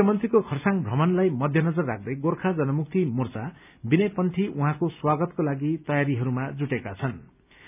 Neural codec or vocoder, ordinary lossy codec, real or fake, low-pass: none; none; real; 3.6 kHz